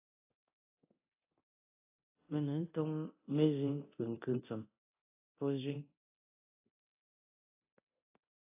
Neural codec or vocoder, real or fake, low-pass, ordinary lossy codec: codec, 24 kHz, 0.9 kbps, DualCodec; fake; 3.6 kHz; AAC, 24 kbps